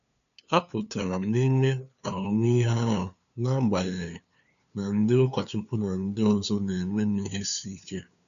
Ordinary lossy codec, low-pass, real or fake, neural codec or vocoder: none; 7.2 kHz; fake; codec, 16 kHz, 2 kbps, FunCodec, trained on LibriTTS, 25 frames a second